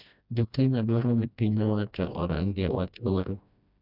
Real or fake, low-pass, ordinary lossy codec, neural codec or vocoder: fake; 5.4 kHz; none; codec, 16 kHz, 1 kbps, FreqCodec, smaller model